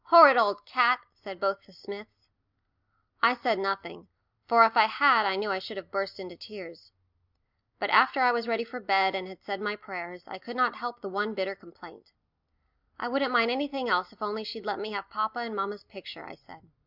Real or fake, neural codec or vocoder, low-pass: real; none; 5.4 kHz